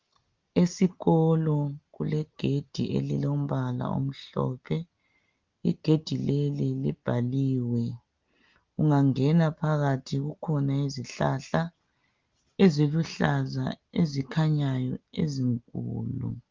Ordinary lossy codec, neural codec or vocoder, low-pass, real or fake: Opus, 32 kbps; none; 7.2 kHz; real